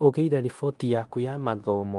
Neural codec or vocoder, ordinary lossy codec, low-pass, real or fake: codec, 16 kHz in and 24 kHz out, 0.9 kbps, LongCat-Audio-Codec, fine tuned four codebook decoder; Opus, 24 kbps; 10.8 kHz; fake